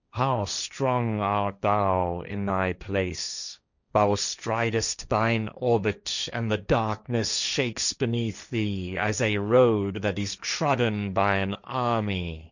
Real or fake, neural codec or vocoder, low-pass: fake; codec, 16 kHz, 1.1 kbps, Voila-Tokenizer; 7.2 kHz